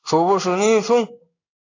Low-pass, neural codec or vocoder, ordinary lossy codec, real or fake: 7.2 kHz; codec, 16 kHz in and 24 kHz out, 1 kbps, XY-Tokenizer; AAC, 48 kbps; fake